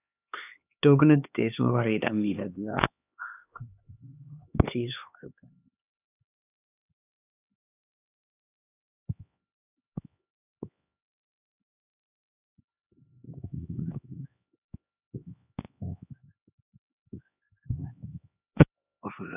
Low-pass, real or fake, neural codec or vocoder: 3.6 kHz; fake; codec, 16 kHz, 2 kbps, X-Codec, HuBERT features, trained on LibriSpeech